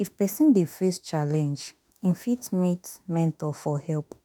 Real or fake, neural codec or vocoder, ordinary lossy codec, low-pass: fake; autoencoder, 48 kHz, 32 numbers a frame, DAC-VAE, trained on Japanese speech; none; none